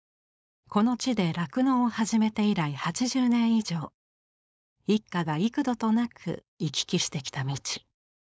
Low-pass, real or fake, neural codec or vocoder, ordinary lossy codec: none; fake; codec, 16 kHz, 4.8 kbps, FACodec; none